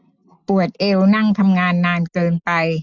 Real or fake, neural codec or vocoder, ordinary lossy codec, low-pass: real; none; none; 7.2 kHz